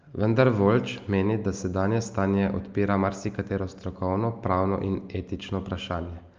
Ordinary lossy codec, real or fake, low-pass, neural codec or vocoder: Opus, 24 kbps; real; 7.2 kHz; none